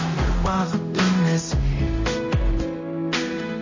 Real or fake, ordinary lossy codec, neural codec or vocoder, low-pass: fake; MP3, 32 kbps; codec, 16 kHz, 0.5 kbps, X-Codec, HuBERT features, trained on general audio; 7.2 kHz